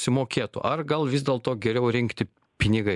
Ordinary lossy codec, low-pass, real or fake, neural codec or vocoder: MP3, 96 kbps; 10.8 kHz; real; none